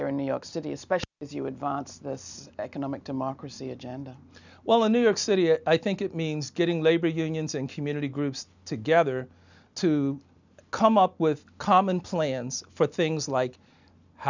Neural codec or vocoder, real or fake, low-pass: none; real; 7.2 kHz